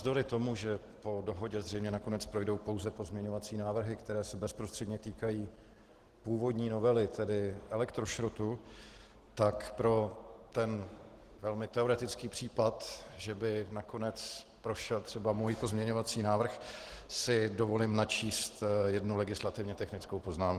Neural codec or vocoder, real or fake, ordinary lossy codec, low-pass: none; real; Opus, 16 kbps; 14.4 kHz